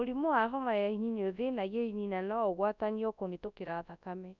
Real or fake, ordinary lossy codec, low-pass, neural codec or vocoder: fake; none; 7.2 kHz; codec, 24 kHz, 0.9 kbps, WavTokenizer, large speech release